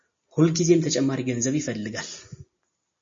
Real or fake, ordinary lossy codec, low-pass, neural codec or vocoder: real; MP3, 32 kbps; 7.2 kHz; none